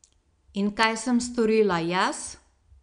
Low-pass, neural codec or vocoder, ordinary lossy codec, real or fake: 9.9 kHz; none; none; real